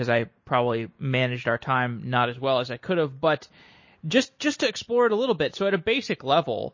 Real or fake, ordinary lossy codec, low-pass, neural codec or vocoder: real; MP3, 32 kbps; 7.2 kHz; none